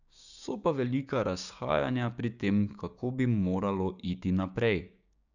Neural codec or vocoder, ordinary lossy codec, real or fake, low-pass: codec, 16 kHz, 6 kbps, DAC; none; fake; 7.2 kHz